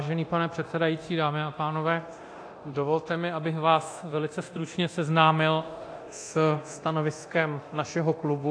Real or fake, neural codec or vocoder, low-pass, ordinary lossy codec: fake; codec, 24 kHz, 0.9 kbps, DualCodec; 9.9 kHz; AAC, 48 kbps